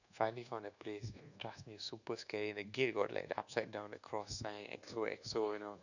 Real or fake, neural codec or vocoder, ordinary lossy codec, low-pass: fake; codec, 24 kHz, 1.2 kbps, DualCodec; none; 7.2 kHz